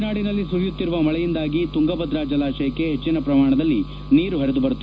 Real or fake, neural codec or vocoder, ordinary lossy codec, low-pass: real; none; none; none